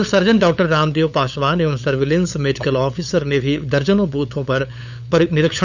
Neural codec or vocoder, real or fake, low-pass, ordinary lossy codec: codec, 16 kHz, 8 kbps, FunCodec, trained on LibriTTS, 25 frames a second; fake; 7.2 kHz; none